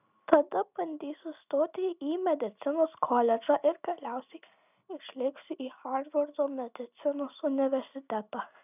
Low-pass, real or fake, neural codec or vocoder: 3.6 kHz; real; none